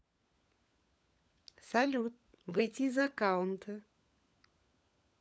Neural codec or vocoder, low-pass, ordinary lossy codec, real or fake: codec, 16 kHz, 16 kbps, FunCodec, trained on LibriTTS, 50 frames a second; none; none; fake